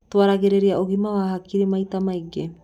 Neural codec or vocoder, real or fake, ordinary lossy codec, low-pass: none; real; none; 19.8 kHz